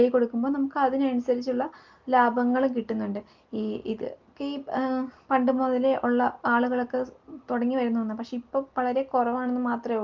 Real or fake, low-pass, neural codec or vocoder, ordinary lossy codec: real; 7.2 kHz; none; Opus, 32 kbps